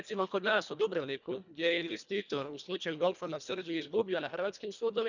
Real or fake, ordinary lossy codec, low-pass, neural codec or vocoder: fake; none; 7.2 kHz; codec, 24 kHz, 1.5 kbps, HILCodec